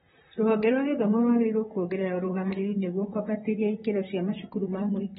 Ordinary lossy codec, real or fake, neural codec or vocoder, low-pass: AAC, 16 kbps; fake; vocoder, 44.1 kHz, 128 mel bands, Pupu-Vocoder; 19.8 kHz